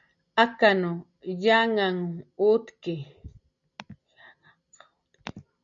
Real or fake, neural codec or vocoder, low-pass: real; none; 7.2 kHz